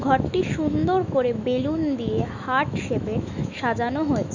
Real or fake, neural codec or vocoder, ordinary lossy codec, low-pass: fake; autoencoder, 48 kHz, 128 numbers a frame, DAC-VAE, trained on Japanese speech; none; 7.2 kHz